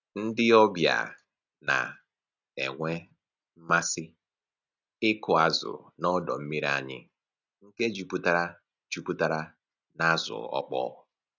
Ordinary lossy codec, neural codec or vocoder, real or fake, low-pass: none; none; real; 7.2 kHz